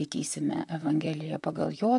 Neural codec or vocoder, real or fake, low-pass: vocoder, 44.1 kHz, 128 mel bands, Pupu-Vocoder; fake; 10.8 kHz